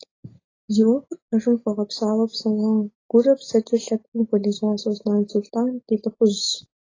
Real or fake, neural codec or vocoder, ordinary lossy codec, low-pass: real; none; AAC, 32 kbps; 7.2 kHz